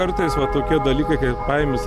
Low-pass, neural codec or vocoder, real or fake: 14.4 kHz; none; real